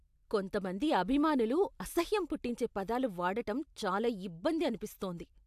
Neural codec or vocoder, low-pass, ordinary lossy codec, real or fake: none; 14.4 kHz; AAC, 96 kbps; real